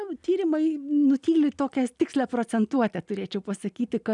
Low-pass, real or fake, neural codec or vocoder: 10.8 kHz; real; none